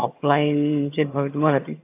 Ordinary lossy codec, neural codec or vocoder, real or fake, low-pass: AAC, 24 kbps; vocoder, 22.05 kHz, 80 mel bands, HiFi-GAN; fake; 3.6 kHz